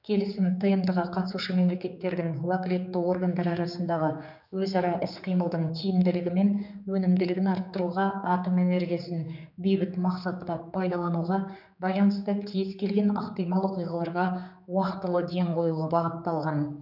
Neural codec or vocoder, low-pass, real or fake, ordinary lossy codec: codec, 16 kHz, 4 kbps, X-Codec, HuBERT features, trained on general audio; 5.4 kHz; fake; none